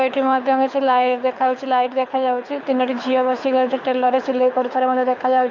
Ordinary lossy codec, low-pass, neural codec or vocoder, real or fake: none; 7.2 kHz; codec, 24 kHz, 6 kbps, HILCodec; fake